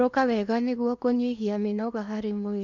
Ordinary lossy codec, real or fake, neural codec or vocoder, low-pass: none; fake; codec, 16 kHz in and 24 kHz out, 0.8 kbps, FocalCodec, streaming, 65536 codes; 7.2 kHz